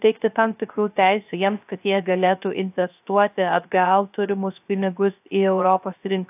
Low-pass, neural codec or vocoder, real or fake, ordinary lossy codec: 3.6 kHz; codec, 16 kHz, 0.3 kbps, FocalCodec; fake; AAC, 32 kbps